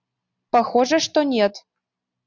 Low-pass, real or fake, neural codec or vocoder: 7.2 kHz; real; none